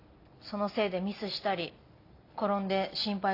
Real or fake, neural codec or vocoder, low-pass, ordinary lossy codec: real; none; 5.4 kHz; AAC, 32 kbps